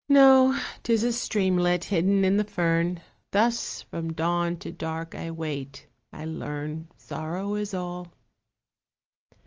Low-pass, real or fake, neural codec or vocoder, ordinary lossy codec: 7.2 kHz; real; none; Opus, 24 kbps